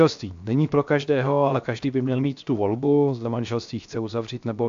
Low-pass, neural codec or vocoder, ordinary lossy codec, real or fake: 7.2 kHz; codec, 16 kHz, 0.7 kbps, FocalCodec; AAC, 64 kbps; fake